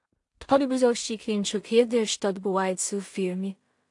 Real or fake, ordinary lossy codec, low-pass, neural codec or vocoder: fake; AAC, 64 kbps; 10.8 kHz; codec, 16 kHz in and 24 kHz out, 0.4 kbps, LongCat-Audio-Codec, two codebook decoder